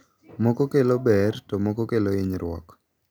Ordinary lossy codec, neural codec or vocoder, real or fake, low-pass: none; none; real; none